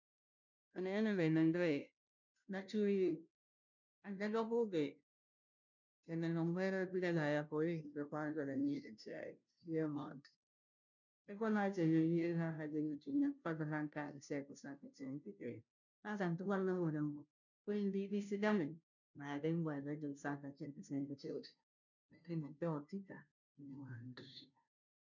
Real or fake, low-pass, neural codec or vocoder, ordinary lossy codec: fake; 7.2 kHz; codec, 16 kHz, 0.5 kbps, FunCodec, trained on Chinese and English, 25 frames a second; none